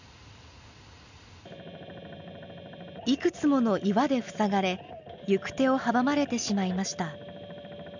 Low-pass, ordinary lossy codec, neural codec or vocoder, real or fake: 7.2 kHz; none; none; real